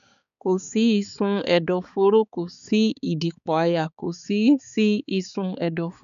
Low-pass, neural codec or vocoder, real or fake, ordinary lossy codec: 7.2 kHz; codec, 16 kHz, 4 kbps, X-Codec, HuBERT features, trained on balanced general audio; fake; none